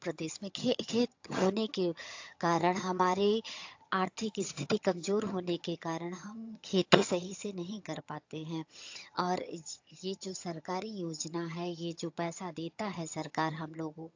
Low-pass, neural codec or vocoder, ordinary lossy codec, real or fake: 7.2 kHz; vocoder, 22.05 kHz, 80 mel bands, HiFi-GAN; AAC, 48 kbps; fake